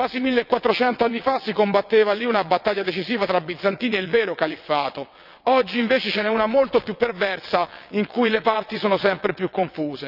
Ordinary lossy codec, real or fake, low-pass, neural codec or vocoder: none; fake; 5.4 kHz; vocoder, 22.05 kHz, 80 mel bands, WaveNeXt